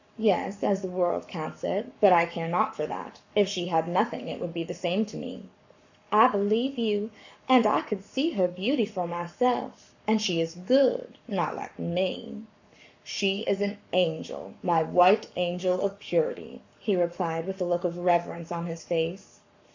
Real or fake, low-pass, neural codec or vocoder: fake; 7.2 kHz; codec, 44.1 kHz, 7.8 kbps, Pupu-Codec